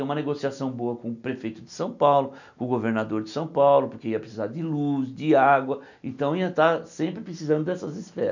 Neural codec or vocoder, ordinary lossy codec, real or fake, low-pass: none; none; real; 7.2 kHz